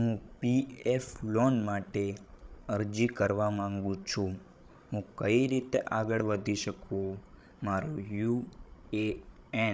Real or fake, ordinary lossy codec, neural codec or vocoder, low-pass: fake; none; codec, 16 kHz, 16 kbps, FreqCodec, larger model; none